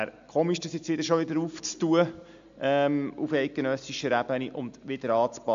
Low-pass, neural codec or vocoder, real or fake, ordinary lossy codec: 7.2 kHz; none; real; AAC, 64 kbps